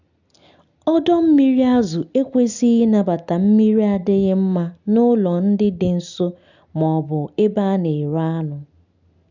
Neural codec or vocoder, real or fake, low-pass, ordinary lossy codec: none; real; 7.2 kHz; none